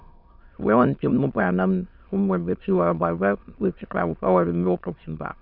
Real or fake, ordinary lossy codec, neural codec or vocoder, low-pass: fake; none; autoencoder, 22.05 kHz, a latent of 192 numbers a frame, VITS, trained on many speakers; 5.4 kHz